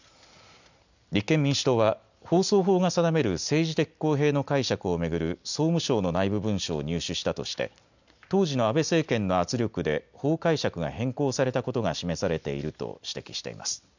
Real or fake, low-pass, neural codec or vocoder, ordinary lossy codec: real; 7.2 kHz; none; none